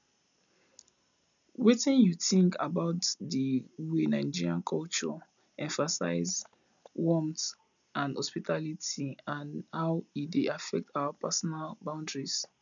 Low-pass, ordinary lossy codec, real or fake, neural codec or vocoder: 7.2 kHz; none; real; none